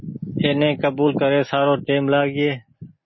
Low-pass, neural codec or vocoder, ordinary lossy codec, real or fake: 7.2 kHz; none; MP3, 24 kbps; real